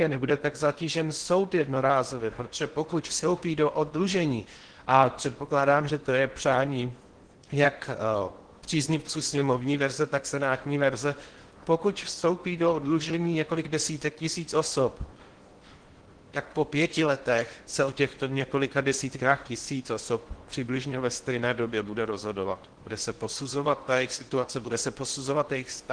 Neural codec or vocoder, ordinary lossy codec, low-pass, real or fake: codec, 16 kHz in and 24 kHz out, 0.8 kbps, FocalCodec, streaming, 65536 codes; Opus, 16 kbps; 9.9 kHz; fake